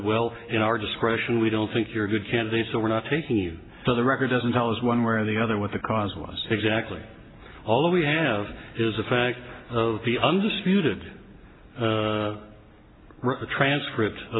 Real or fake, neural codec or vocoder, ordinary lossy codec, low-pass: real; none; AAC, 16 kbps; 7.2 kHz